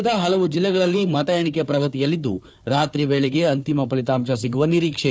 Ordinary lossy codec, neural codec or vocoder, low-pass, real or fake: none; codec, 16 kHz, 4 kbps, FunCodec, trained on Chinese and English, 50 frames a second; none; fake